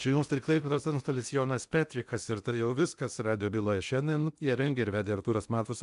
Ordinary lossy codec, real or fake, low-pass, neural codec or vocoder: MP3, 96 kbps; fake; 10.8 kHz; codec, 16 kHz in and 24 kHz out, 0.8 kbps, FocalCodec, streaming, 65536 codes